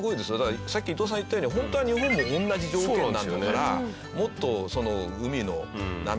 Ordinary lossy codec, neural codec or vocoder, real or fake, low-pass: none; none; real; none